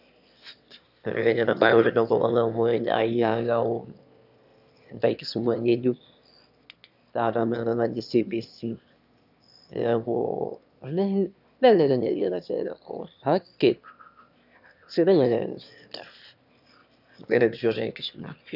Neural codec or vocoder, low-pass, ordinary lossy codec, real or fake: autoencoder, 22.05 kHz, a latent of 192 numbers a frame, VITS, trained on one speaker; 5.4 kHz; none; fake